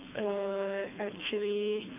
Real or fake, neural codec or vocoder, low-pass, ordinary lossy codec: fake; codec, 24 kHz, 3 kbps, HILCodec; 3.6 kHz; none